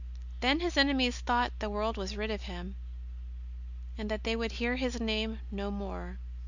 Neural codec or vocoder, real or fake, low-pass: none; real; 7.2 kHz